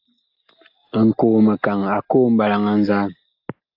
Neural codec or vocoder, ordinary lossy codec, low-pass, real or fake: none; AAC, 48 kbps; 5.4 kHz; real